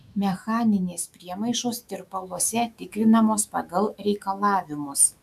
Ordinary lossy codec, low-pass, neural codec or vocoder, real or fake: MP3, 96 kbps; 14.4 kHz; autoencoder, 48 kHz, 128 numbers a frame, DAC-VAE, trained on Japanese speech; fake